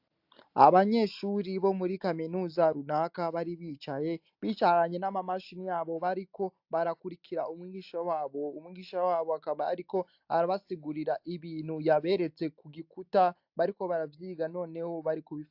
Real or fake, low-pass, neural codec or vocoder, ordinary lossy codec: real; 5.4 kHz; none; AAC, 48 kbps